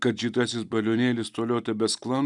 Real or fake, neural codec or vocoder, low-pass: real; none; 10.8 kHz